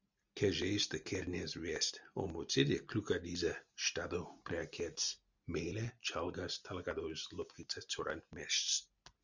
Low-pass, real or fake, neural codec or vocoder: 7.2 kHz; real; none